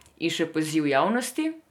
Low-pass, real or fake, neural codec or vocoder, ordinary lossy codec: 19.8 kHz; real; none; MP3, 96 kbps